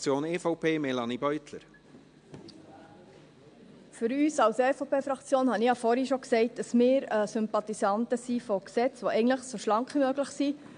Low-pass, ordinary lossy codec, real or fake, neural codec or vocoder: 9.9 kHz; AAC, 64 kbps; real; none